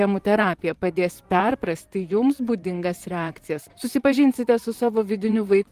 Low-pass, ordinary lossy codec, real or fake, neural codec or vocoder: 14.4 kHz; Opus, 24 kbps; fake; vocoder, 44.1 kHz, 128 mel bands, Pupu-Vocoder